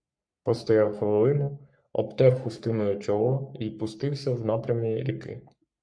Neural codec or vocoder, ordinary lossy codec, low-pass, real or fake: codec, 44.1 kHz, 3.4 kbps, Pupu-Codec; MP3, 64 kbps; 9.9 kHz; fake